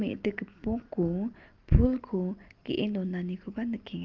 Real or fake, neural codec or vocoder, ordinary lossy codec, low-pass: real; none; Opus, 32 kbps; 7.2 kHz